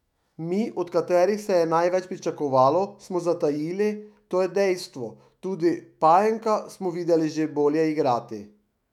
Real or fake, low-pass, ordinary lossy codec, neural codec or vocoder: fake; 19.8 kHz; none; autoencoder, 48 kHz, 128 numbers a frame, DAC-VAE, trained on Japanese speech